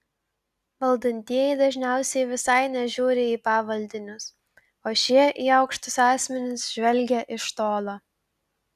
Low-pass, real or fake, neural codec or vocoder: 14.4 kHz; real; none